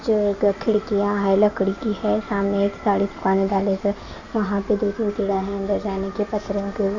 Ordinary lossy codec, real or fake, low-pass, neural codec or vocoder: none; real; 7.2 kHz; none